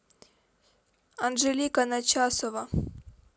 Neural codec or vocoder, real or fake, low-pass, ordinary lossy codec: none; real; none; none